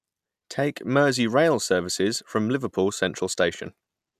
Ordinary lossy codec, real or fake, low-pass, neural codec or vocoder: none; real; 14.4 kHz; none